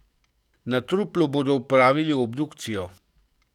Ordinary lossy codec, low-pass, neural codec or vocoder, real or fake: none; 19.8 kHz; codec, 44.1 kHz, 7.8 kbps, DAC; fake